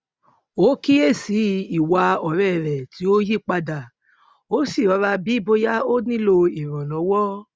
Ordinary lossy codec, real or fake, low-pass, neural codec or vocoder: none; real; none; none